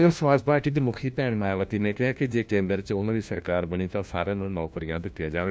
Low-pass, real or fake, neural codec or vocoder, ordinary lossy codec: none; fake; codec, 16 kHz, 1 kbps, FunCodec, trained on LibriTTS, 50 frames a second; none